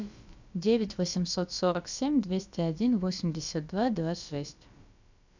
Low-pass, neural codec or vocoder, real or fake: 7.2 kHz; codec, 16 kHz, about 1 kbps, DyCAST, with the encoder's durations; fake